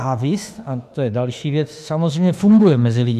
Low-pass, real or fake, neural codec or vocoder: 14.4 kHz; fake; autoencoder, 48 kHz, 32 numbers a frame, DAC-VAE, trained on Japanese speech